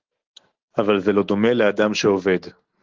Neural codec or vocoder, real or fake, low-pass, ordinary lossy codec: none; real; 7.2 kHz; Opus, 16 kbps